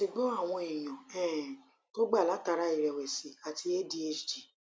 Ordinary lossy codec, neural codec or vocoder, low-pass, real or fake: none; none; none; real